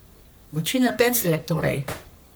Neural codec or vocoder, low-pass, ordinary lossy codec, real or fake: codec, 44.1 kHz, 3.4 kbps, Pupu-Codec; none; none; fake